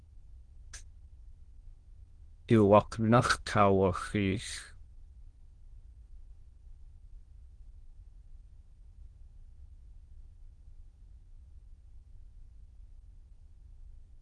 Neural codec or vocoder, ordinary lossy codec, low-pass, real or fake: autoencoder, 22.05 kHz, a latent of 192 numbers a frame, VITS, trained on many speakers; Opus, 16 kbps; 9.9 kHz; fake